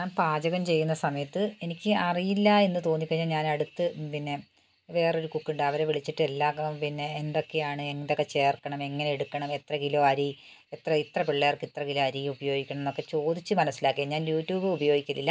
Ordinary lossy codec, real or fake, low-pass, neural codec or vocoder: none; real; none; none